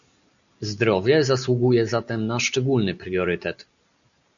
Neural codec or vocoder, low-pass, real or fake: none; 7.2 kHz; real